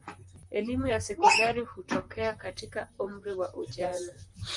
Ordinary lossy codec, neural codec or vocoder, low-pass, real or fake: MP3, 96 kbps; vocoder, 44.1 kHz, 128 mel bands, Pupu-Vocoder; 10.8 kHz; fake